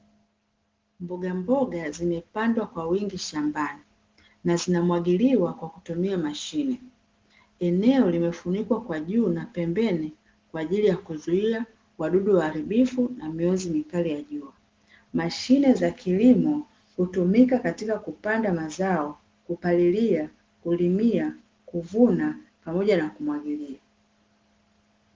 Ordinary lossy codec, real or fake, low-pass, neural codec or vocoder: Opus, 16 kbps; real; 7.2 kHz; none